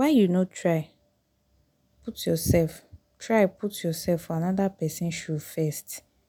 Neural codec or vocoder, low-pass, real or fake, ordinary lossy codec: none; none; real; none